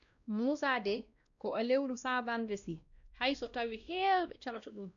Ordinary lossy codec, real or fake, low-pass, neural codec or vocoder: none; fake; 7.2 kHz; codec, 16 kHz, 1 kbps, X-Codec, WavLM features, trained on Multilingual LibriSpeech